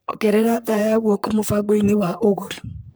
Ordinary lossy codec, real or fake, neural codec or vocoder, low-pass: none; fake; codec, 44.1 kHz, 3.4 kbps, Pupu-Codec; none